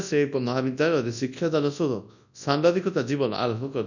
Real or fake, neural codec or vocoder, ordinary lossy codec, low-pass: fake; codec, 24 kHz, 0.9 kbps, WavTokenizer, large speech release; none; 7.2 kHz